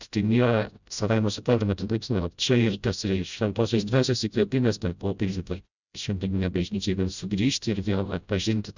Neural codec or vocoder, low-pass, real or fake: codec, 16 kHz, 0.5 kbps, FreqCodec, smaller model; 7.2 kHz; fake